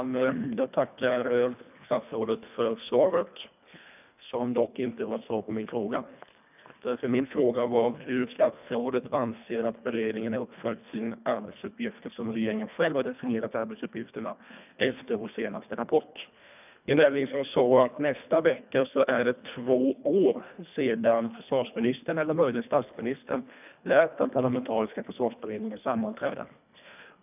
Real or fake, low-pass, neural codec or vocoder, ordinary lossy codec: fake; 3.6 kHz; codec, 24 kHz, 1.5 kbps, HILCodec; none